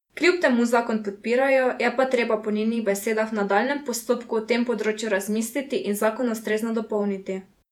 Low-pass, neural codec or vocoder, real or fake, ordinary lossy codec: 19.8 kHz; vocoder, 48 kHz, 128 mel bands, Vocos; fake; none